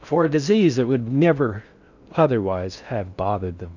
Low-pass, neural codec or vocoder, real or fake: 7.2 kHz; codec, 16 kHz in and 24 kHz out, 0.6 kbps, FocalCodec, streaming, 4096 codes; fake